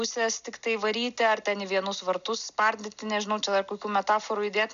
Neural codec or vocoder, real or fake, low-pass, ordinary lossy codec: none; real; 7.2 kHz; AAC, 96 kbps